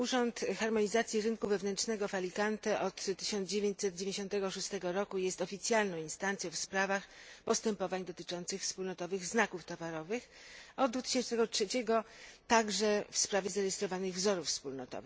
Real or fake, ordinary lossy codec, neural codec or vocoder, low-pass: real; none; none; none